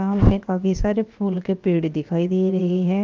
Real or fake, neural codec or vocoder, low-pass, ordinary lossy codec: fake; codec, 16 kHz, 0.7 kbps, FocalCodec; 7.2 kHz; Opus, 32 kbps